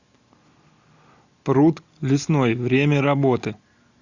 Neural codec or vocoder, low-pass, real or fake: none; 7.2 kHz; real